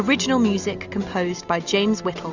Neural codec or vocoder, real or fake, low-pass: none; real; 7.2 kHz